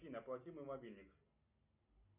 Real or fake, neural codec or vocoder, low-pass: real; none; 3.6 kHz